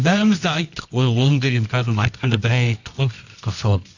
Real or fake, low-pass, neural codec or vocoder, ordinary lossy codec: fake; 7.2 kHz; codec, 24 kHz, 0.9 kbps, WavTokenizer, medium music audio release; none